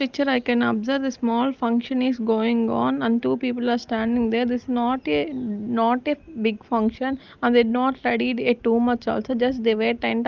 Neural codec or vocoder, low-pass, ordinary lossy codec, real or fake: none; 7.2 kHz; Opus, 32 kbps; real